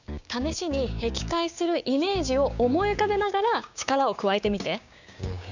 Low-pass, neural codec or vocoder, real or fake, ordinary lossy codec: 7.2 kHz; codec, 16 kHz, 6 kbps, DAC; fake; none